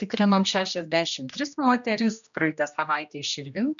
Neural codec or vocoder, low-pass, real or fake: codec, 16 kHz, 1 kbps, X-Codec, HuBERT features, trained on general audio; 7.2 kHz; fake